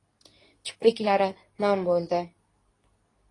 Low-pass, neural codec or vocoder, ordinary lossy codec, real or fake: 10.8 kHz; codec, 24 kHz, 0.9 kbps, WavTokenizer, medium speech release version 2; AAC, 32 kbps; fake